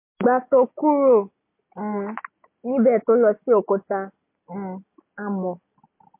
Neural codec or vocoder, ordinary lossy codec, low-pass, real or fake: none; MP3, 24 kbps; 3.6 kHz; real